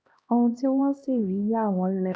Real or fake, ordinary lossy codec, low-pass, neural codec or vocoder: fake; none; none; codec, 16 kHz, 1 kbps, X-Codec, HuBERT features, trained on LibriSpeech